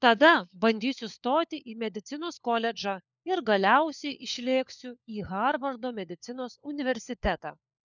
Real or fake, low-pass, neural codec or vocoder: fake; 7.2 kHz; codec, 16 kHz, 4 kbps, FunCodec, trained on LibriTTS, 50 frames a second